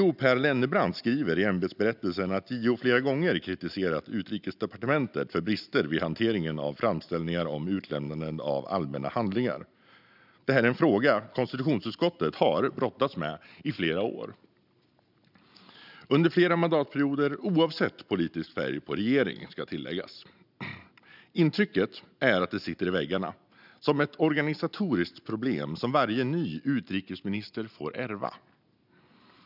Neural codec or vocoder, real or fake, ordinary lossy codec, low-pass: none; real; none; 5.4 kHz